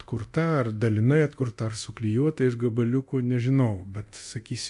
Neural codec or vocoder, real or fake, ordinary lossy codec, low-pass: codec, 24 kHz, 0.9 kbps, DualCodec; fake; AAC, 64 kbps; 10.8 kHz